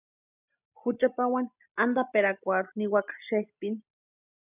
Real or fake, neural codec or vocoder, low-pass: real; none; 3.6 kHz